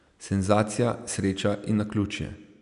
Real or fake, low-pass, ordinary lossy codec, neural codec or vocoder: real; 10.8 kHz; none; none